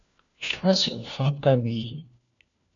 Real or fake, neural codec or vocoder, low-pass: fake; codec, 16 kHz, 1 kbps, FunCodec, trained on LibriTTS, 50 frames a second; 7.2 kHz